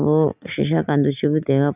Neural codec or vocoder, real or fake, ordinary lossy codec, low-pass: none; real; none; 3.6 kHz